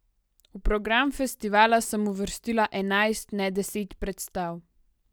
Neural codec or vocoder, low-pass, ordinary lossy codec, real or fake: none; none; none; real